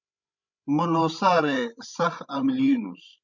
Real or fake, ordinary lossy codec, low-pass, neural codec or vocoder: fake; MP3, 64 kbps; 7.2 kHz; codec, 16 kHz, 16 kbps, FreqCodec, larger model